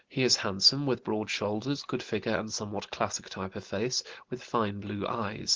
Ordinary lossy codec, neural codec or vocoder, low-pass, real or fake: Opus, 16 kbps; none; 7.2 kHz; real